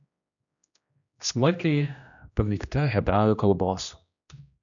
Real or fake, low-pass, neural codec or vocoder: fake; 7.2 kHz; codec, 16 kHz, 1 kbps, X-Codec, HuBERT features, trained on general audio